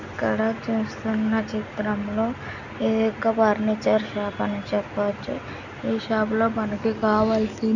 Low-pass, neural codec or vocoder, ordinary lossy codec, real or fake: 7.2 kHz; none; none; real